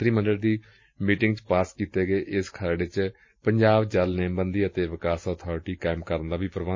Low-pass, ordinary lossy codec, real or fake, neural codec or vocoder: 7.2 kHz; MP3, 32 kbps; real; none